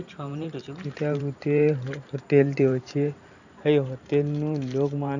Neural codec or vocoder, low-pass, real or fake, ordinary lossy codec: vocoder, 44.1 kHz, 128 mel bands every 512 samples, BigVGAN v2; 7.2 kHz; fake; none